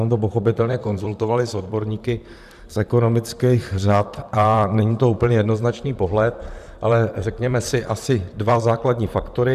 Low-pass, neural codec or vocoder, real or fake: 14.4 kHz; vocoder, 44.1 kHz, 128 mel bands, Pupu-Vocoder; fake